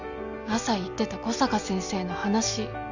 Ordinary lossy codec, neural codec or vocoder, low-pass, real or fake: none; none; 7.2 kHz; real